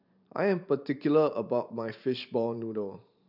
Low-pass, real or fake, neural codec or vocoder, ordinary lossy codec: 5.4 kHz; fake; vocoder, 44.1 kHz, 128 mel bands every 512 samples, BigVGAN v2; none